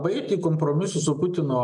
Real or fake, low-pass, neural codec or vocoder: real; 10.8 kHz; none